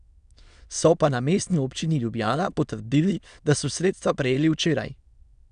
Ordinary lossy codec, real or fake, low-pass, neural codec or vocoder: none; fake; 9.9 kHz; autoencoder, 22.05 kHz, a latent of 192 numbers a frame, VITS, trained on many speakers